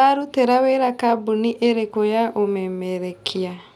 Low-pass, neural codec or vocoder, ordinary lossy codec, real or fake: 19.8 kHz; none; none; real